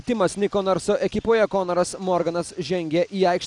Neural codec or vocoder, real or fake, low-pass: none; real; 10.8 kHz